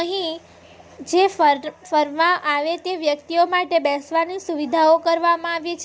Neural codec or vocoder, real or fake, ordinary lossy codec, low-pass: none; real; none; none